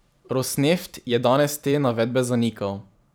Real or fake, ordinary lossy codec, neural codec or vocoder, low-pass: fake; none; vocoder, 44.1 kHz, 128 mel bands every 512 samples, BigVGAN v2; none